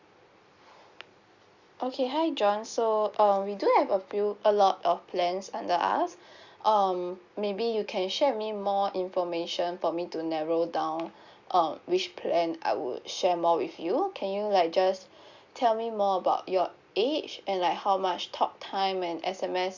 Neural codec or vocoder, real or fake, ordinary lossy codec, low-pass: none; real; Opus, 64 kbps; 7.2 kHz